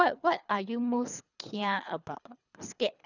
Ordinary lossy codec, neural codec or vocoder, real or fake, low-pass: none; codec, 24 kHz, 3 kbps, HILCodec; fake; 7.2 kHz